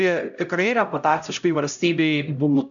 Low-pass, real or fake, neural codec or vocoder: 7.2 kHz; fake; codec, 16 kHz, 0.5 kbps, X-Codec, HuBERT features, trained on LibriSpeech